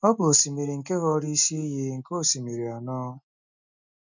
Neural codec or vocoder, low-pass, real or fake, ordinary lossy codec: none; 7.2 kHz; real; none